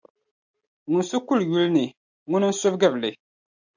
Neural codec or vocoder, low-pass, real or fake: none; 7.2 kHz; real